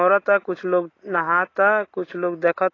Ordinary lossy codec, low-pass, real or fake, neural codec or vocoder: AAC, 32 kbps; 7.2 kHz; real; none